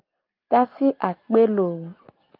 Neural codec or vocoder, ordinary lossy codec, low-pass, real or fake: none; Opus, 32 kbps; 5.4 kHz; real